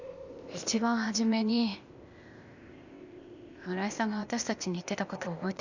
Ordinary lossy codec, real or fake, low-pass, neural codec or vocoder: Opus, 64 kbps; fake; 7.2 kHz; codec, 16 kHz, 0.8 kbps, ZipCodec